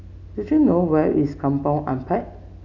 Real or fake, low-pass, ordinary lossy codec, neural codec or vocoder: real; 7.2 kHz; none; none